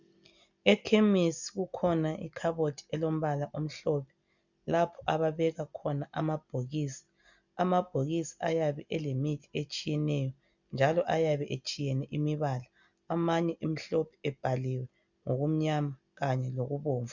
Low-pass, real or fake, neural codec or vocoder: 7.2 kHz; real; none